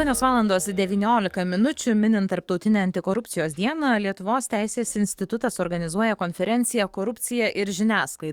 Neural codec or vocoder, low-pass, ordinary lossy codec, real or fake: codec, 44.1 kHz, 7.8 kbps, DAC; 19.8 kHz; Opus, 64 kbps; fake